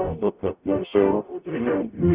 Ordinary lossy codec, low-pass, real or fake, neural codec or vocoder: Opus, 64 kbps; 3.6 kHz; fake; codec, 44.1 kHz, 0.9 kbps, DAC